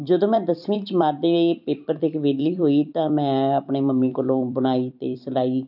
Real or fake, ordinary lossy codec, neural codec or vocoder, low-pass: fake; none; vocoder, 44.1 kHz, 128 mel bands every 256 samples, BigVGAN v2; 5.4 kHz